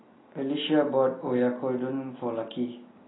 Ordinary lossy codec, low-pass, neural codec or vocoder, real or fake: AAC, 16 kbps; 7.2 kHz; none; real